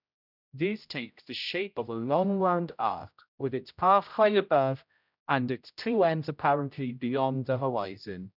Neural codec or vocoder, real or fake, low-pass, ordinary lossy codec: codec, 16 kHz, 0.5 kbps, X-Codec, HuBERT features, trained on general audio; fake; 5.4 kHz; none